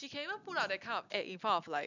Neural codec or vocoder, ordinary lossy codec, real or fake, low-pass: none; none; real; 7.2 kHz